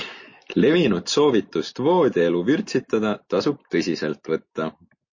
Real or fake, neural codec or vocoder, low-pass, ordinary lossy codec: real; none; 7.2 kHz; MP3, 32 kbps